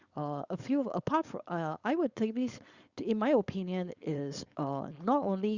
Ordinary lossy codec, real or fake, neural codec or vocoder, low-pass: Opus, 64 kbps; fake; codec, 16 kHz, 4.8 kbps, FACodec; 7.2 kHz